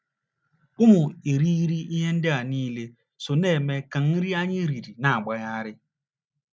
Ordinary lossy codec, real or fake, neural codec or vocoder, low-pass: none; real; none; none